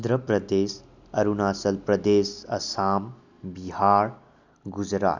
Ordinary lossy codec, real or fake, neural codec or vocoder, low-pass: none; real; none; 7.2 kHz